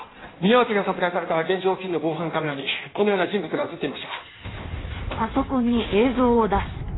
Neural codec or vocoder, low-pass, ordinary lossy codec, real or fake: codec, 16 kHz in and 24 kHz out, 1.1 kbps, FireRedTTS-2 codec; 7.2 kHz; AAC, 16 kbps; fake